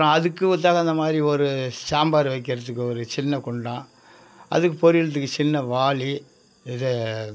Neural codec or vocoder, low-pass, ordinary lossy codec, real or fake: none; none; none; real